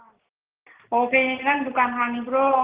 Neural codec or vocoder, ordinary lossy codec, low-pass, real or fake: none; Opus, 16 kbps; 3.6 kHz; real